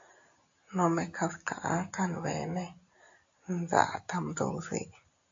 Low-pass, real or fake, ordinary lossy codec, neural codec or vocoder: 7.2 kHz; real; AAC, 48 kbps; none